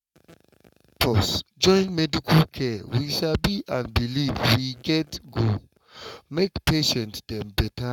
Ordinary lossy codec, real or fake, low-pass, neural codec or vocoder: none; fake; 19.8 kHz; codec, 44.1 kHz, 7.8 kbps, Pupu-Codec